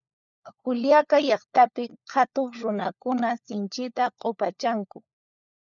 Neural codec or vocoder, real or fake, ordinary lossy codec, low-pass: codec, 16 kHz, 4 kbps, FunCodec, trained on LibriTTS, 50 frames a second; fake; MP3, 96 kbps; 7.2 kHz